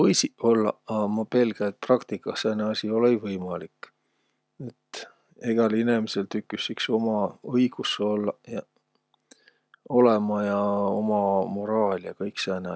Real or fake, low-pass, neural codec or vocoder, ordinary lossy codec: real; none; none; none